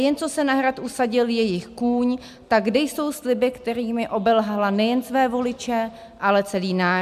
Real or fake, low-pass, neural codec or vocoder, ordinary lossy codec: real; 14.4 kHz; none; MP3, 96 kbps